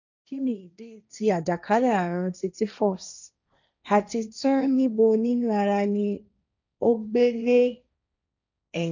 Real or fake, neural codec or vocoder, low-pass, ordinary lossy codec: fake; codec, 16 kHz, 1.1 kbps, Voila-Tokenizer; 7.2 kHz; none